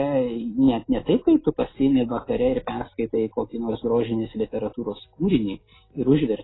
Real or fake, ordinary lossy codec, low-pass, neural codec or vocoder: real; AAC, 16 kbps; 7.2 kHz; none